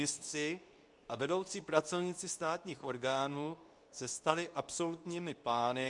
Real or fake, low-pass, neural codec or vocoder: fake; 10.8 kHz; codec, 24 kHz, 0.9 kbps, WavTokenizer, medium speech release version 2